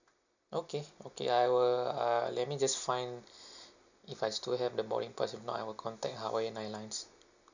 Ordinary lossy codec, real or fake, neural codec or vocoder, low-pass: none; real; none; 7.2 kHz